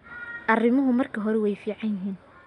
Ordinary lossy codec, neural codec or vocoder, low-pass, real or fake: none; none; 10.8 kHz; real